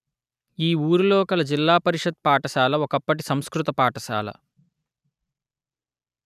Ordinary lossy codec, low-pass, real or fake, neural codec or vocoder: none; 14.4 kHz; real; none